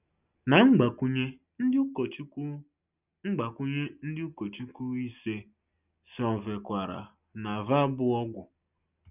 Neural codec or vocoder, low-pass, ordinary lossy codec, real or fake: none; 3.6 kHz; none; real